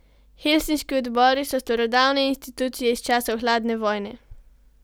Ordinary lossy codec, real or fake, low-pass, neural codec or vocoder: none; real; none; none